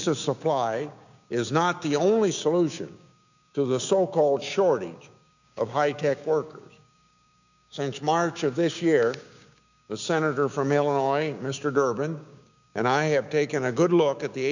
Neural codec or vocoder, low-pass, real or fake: codec, 16 kHz, 6 kbps, DAC; 7.2 kHz; fake